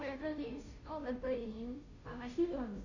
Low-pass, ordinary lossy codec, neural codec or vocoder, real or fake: 7.2 kHz; none; codec, 16 kHz, 0.5 kbps, FunCodec, trained on Chinese and English, 25 frames a second; fake